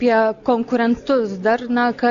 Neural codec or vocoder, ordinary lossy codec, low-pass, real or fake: none; Opus, 64 kbps; 7.2 kHz; real